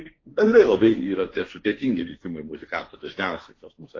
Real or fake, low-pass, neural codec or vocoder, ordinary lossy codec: fake; 7.2 kHz; codec, 16 kHz, 1.1 kbps, Voila-Tokenizer; AAC, 32 kbps